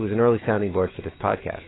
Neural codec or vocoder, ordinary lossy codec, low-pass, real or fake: autoencoder, 48 kHz, 128 numbers a frame, DAC-VAE, trained on Japanese speech; AAC, 16 kbps; 7.2 kHz; fake